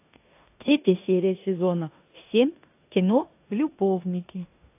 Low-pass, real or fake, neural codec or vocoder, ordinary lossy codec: 3.6 kHz; fake; codec, 16 kHz in and 24 kHz out, 0.9 kbps, LongCat-Audio-Codec, four codebook decoder; AAC, 24 kbps